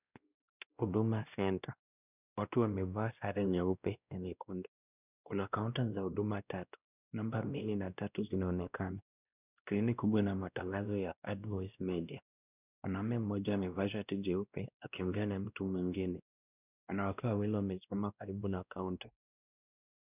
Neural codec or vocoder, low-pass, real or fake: codec, 16 kHz, 1 kbps, X-Codec, WavLM features, trained on Multilingual LibriSpeech; 3.6 kHz; fake